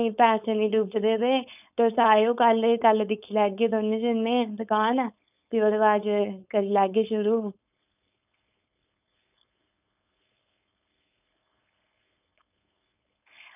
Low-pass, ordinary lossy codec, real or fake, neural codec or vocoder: 3.6 kHz; none; fake; codec, 16 kHz, 4.8 kbps, FACodec